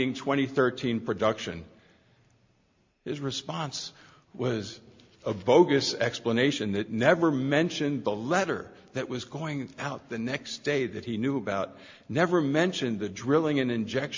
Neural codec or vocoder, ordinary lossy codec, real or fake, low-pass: none; MP3, 48 kbps; real; 7.2 kHz